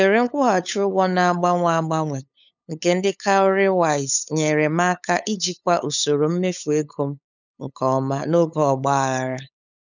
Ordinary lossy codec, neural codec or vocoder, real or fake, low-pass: none; codec, 16 kHz, 8 kbps, FunCodec, trained on LibriTTS, 25 frames a second; fake; 7.2 kHz